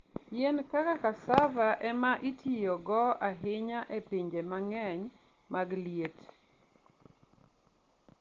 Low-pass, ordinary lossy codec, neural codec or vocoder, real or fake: 7.2 kHz; Opus, 16 kbps; none; real